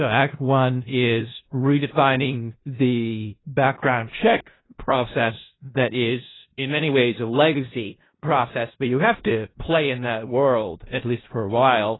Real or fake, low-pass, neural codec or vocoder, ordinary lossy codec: fake; 7.2 kHz; codec, 16 kHz in and 24 kHz out, 0.4 kbps, LongCat-Audio-Codec, four codebook decoder; AAC, 16 kbps